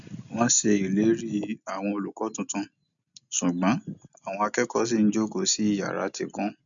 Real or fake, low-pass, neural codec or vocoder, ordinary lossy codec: real; 7.2 kHz; none; none